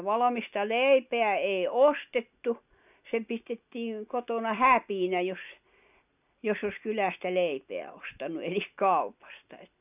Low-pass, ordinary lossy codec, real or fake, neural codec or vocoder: 3.6 kHz; none; real; none